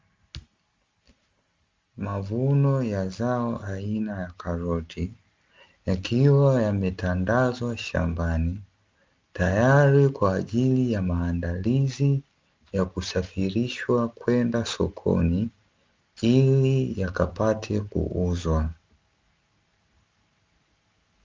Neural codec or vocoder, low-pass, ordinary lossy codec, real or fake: none; 7.2 kHz; Opus, 32 kbps; real